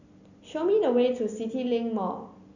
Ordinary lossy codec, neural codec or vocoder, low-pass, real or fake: none; none; 7.2 kHz; real